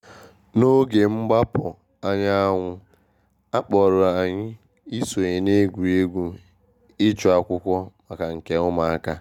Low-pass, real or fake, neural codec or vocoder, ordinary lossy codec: 19.8 kHz; real; none; none